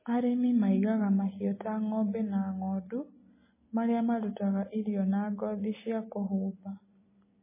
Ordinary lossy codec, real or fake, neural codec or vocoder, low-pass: MP3, 16 kbps; real; none; 3.6 kHz